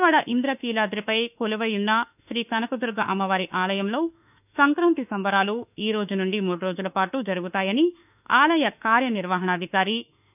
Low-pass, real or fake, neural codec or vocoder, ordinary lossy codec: 3.6 kHz; fake; autoencoder, 48 kHz, 32 numbers a frame, DAC-VAE, trained on Japanese speech; none